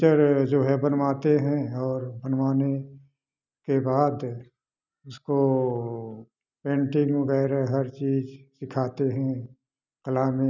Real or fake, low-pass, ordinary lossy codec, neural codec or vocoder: real; 7.2 kHz; none; none